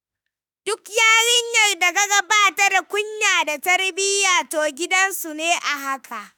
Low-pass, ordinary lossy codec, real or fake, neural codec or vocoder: none; none; fake; autoencoder, 48 kHz, 32 numbers a frame, DAC-VAE, trained on Japanese speech